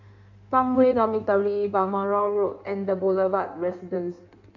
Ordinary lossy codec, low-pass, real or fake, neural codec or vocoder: none; 7.2 kHz; fake; codec, 16 kHz in and 24 kHz out, 1.1 kbps, FireRedTTS-2 codec